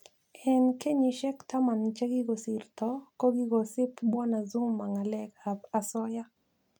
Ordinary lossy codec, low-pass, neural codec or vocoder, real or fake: none; 19.8 kHz; none; real